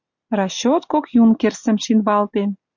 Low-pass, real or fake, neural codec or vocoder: 7.2 kHz; real; none